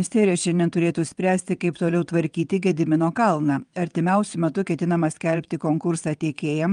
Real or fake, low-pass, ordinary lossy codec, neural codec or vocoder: fake; 9.9 kHz; Opus, 32 kbps; vocoder, 22.05 kHz, 80 mel bands, WaveNeXt